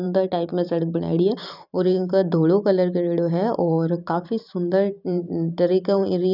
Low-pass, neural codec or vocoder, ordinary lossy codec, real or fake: 5.4 kHz; vocoder, 44.1 kHz, 128 mel bands every 512 samples, BigVGAN v2; none; fake